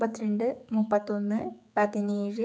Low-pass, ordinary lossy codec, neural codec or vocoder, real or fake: none; none; codec, 16 kHz, 4 kbps, X-Codec, HuBERT features, trained on general audio; fake